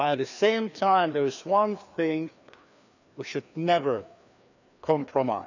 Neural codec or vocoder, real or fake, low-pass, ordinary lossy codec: codec, 16 kHz, 2 kbps, FreqCodec, larger model; fake; 7.2 kHz; none